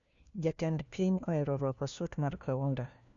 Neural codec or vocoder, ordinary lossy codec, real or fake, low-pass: codec, 16 kHz, 1 kbps, FunCodec, trained on LibriTTS, 50 frames a second; MP3, 96 kbps; fake; 7.2 kHz